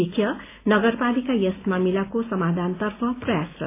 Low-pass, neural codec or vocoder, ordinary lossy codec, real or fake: 3.6 kHz; none; AAC, 24 kbps; real